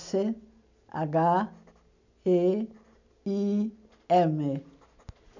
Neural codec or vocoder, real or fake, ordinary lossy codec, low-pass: none; real; none; 7.2 kHz